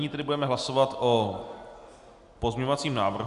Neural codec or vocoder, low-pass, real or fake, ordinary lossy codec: none; 10.8 kHz; real; AAC, 96 kbps